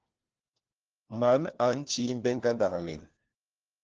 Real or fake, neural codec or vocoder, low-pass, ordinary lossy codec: fake; codec, 16 kHz, 1 kbps, FunCodec, trained on LibriTTS, 50 frames a second; 7.2 kHz; Opus, 16 kbps